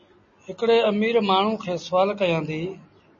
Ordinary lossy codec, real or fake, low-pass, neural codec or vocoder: MP3, 32 kbps; real; 7.2 kHz; none